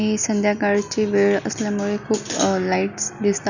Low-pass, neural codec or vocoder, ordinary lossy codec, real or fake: 7.2 kHz; none; none; real